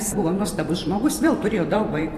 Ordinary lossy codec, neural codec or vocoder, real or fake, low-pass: AAC, 64 kbps; vocoder, 44.1 kHz, 128 mel bands every 512 samples, BigVGAN v2; fake; 14.4 kHz